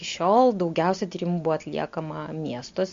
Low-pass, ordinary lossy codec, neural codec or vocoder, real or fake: 7.2 kHz; MP3, 48 kbps; none; real